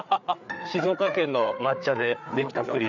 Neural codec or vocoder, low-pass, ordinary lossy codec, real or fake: codec, 16 kHz, 4 kbps, FreqCodec, larger model; 7.2 kHz; none; fake